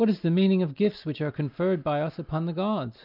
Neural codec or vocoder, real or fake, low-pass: none; real; 5.4 kHz